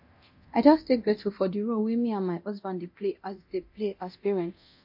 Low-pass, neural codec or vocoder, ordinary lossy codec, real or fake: 5.4 kHz; codec, 24 kHz, 0.9 kbps, DualCodec; MP3, 32 kbps; fake